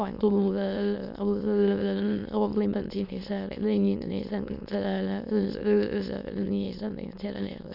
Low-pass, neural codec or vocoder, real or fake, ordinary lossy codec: 5.4 kHz; autoencoder, 22.05 kHz, a latent of 192 numbers a frame, VITS, trained on many speakers; fake; none